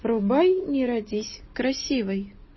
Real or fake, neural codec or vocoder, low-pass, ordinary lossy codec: real; none; 7.2 kHz; MP3, 24 kbps